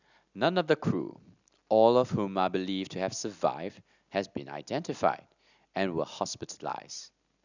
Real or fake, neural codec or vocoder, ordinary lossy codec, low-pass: real; none; none; 7.2 kHz